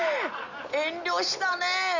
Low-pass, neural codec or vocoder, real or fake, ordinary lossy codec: 7.2 kHz; none; real; none